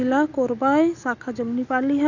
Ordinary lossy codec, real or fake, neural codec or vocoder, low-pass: none; real; none; 7.2 kHz